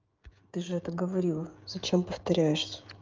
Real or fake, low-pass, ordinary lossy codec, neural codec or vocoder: fake; 7.2 kHz; Opus, 24 kbps; codec, 16 kHz, 16 kbps, FreqCodec, smaller model